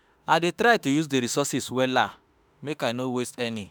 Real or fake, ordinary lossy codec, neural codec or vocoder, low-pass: fake; none; autoencoder, 48 kHz, 32 numbers a frame, DAC-VAE, trained on Japanese speech; none